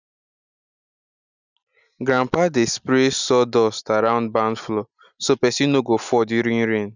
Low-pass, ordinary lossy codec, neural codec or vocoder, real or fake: 7.2 kHz; none; none; real